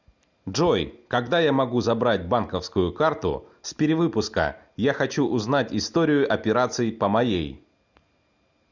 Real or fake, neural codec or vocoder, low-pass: real; none; 7.2 kHz